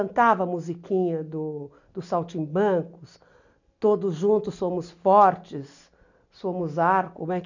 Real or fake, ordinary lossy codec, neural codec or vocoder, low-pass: real; none; none; 7.2 kHz